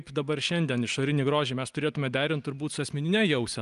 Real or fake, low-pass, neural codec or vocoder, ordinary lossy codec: real; 10.8 kHz; none; Opus, 32 kbps